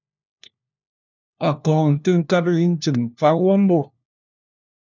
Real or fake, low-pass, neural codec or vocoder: fake; 7.2 kHz; codec, 16 kHz, 1 kbps, FunCodec, trained on LibriTTS, 50 frames a second